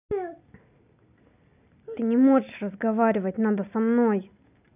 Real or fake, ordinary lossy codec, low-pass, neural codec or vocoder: real; none; 3.6 kHz; none